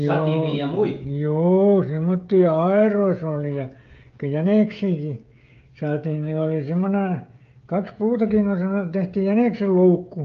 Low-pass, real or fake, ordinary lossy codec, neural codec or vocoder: 7.2 kHz; fake; Opus, 32 kbps; codec, 16 kHz, 16 kbps, FreqCodec, smaller model